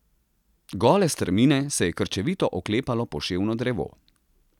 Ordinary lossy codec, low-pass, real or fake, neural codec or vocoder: none; 19.8 kHz; real; none